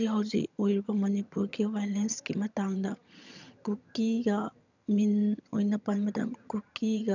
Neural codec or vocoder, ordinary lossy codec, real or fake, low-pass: vocoder, 22.05 kHz, 80 mel bands, HiFi-GAN; none; fake; 7.2 kHz